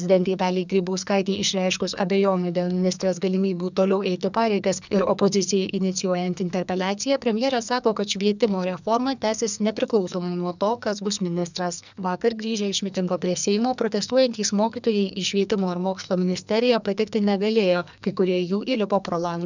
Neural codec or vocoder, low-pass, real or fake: codec, 44.1 kHz, 2.6 kbps, SNAC; 7.2 kHz; fake